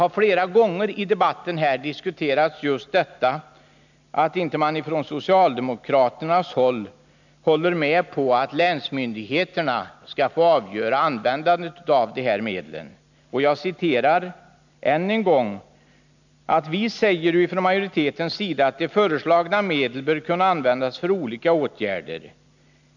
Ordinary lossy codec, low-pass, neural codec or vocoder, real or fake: none; 7.2 kHz; none; real